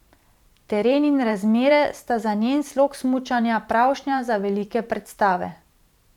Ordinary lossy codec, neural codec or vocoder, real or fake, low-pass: none; vocoder, 44.1 kHz, 128 mel bands every 512 samples, BigVGAN v2; fake; 19.8 kHz